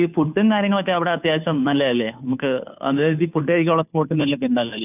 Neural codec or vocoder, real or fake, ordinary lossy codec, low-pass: codec, 16 kHz, 2 kbps, FunCodec, trained on Chinese and English, 25 frames a second; fake; none; 3.6 kHz